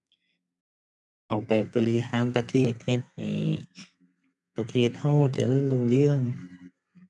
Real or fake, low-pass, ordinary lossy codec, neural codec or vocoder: fake; 10.8 kHz; none; codec, 32 kHz, 1.9 kbps, SNAC